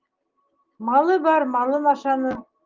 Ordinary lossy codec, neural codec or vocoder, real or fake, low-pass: Opus, 24 kbps; none; real; 7.2 kHz